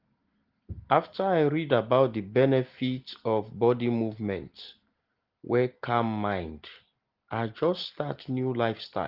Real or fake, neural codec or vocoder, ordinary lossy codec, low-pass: real; none; Opus, 16 kbps; 5.4 kHz